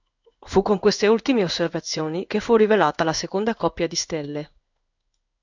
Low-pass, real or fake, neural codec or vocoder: 7.2 kHz; fake; codec, 16 kHz in and 24 kHz out, 1 kbps, XY-Tokenizer